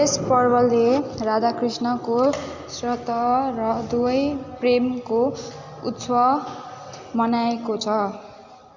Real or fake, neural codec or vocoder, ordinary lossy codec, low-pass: real; none; Opus, 64 kbps; 7.2 kHz